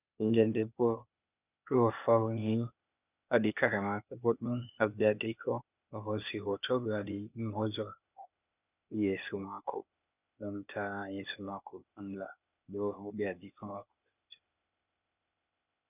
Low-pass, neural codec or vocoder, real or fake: 3.6 kHz; codec, 16 kHz, 0.8 kbps, ZipCodec; fake